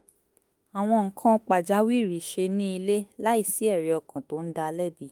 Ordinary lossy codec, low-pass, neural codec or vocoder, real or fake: Opus, 32 kbps; 19.8 kHz; autoencoder, 48 kHz, 32 numbers a frame, DAC-VAE, trained on Japanese speech; fake